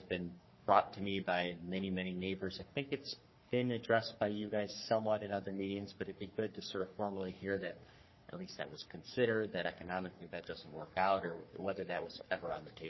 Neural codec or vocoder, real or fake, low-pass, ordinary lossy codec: codec, 44.1 kHz, 3.4 kbps, Pupu-Codec; fake; 7.2 kHz; MP3, 24 kbps